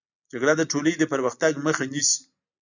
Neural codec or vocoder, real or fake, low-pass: none; real; 7.2 kHz